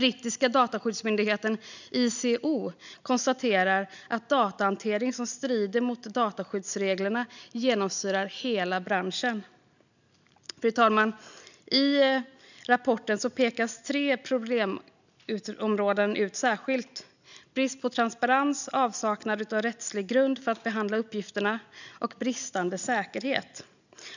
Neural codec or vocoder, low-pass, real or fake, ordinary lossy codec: none; 7.2 kHz; real; none